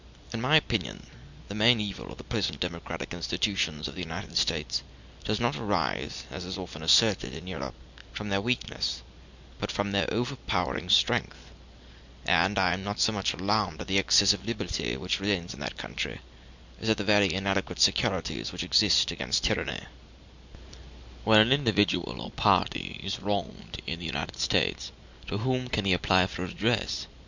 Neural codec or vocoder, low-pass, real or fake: none; 7.2 kHz; real